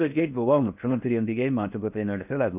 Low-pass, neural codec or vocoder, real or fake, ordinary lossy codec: 3.6 kHz; codec, 16 kHz in and 24 kHz out, 0.6 kbps, FocalCodec, streaming, 4096 codes; fake; none